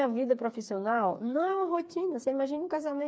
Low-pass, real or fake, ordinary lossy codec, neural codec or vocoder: none; fake; none; codec, 16 kHz, 4 kbps, FreqCodec, smaller model